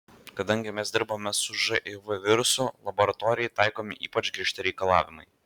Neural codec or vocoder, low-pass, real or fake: none; 19.8 kHz; real